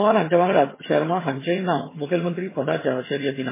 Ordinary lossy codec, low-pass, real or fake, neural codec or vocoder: MP3, 16 kbps; 3.6 kHz; fake; vocoder, 22.05 kHz, 80 mel bands, HiFi-GAN